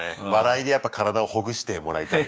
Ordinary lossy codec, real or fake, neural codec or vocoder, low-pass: none; fake; codec, 16 kHz, 6 kbps, DAC; none